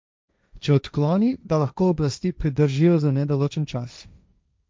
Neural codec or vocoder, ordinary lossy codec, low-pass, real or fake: codec, 16 kHz, 1.1 kbps, Voila-Tokenizer; none; none; fake